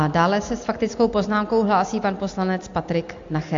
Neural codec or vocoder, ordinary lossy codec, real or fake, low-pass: none; AAC, 48 kbps; real; 7.2 kHz